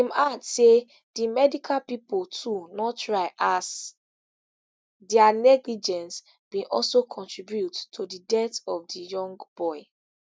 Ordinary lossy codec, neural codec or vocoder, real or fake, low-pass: none; none; real; none